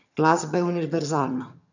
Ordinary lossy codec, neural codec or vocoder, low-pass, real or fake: none; vocoder, 22.05 kHz, 80 mel bands, HiFi-GAN; 7.2 kHz; fake